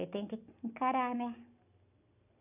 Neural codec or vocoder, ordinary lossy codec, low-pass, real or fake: none; AAC, 32 kbps; 3.6 kHz; real